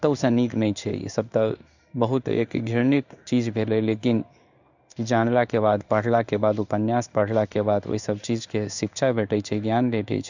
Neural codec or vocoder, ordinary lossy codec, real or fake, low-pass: codec, 16 kHz in and 24 kHz out, 1 kbps, XY-Tokenizer; none; fake; 7.2 kHz